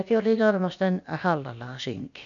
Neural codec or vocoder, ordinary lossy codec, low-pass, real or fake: codec, 16 kHz, about 1 kbps, DyCAST, with the encoder's durations; none; 7.2 kHz; fake